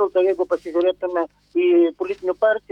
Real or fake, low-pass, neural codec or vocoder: fake; 19.8 kHz; autoencoder, 48 kHz, 128 numbers a frame, DAC-VAE, trained on Japanese speech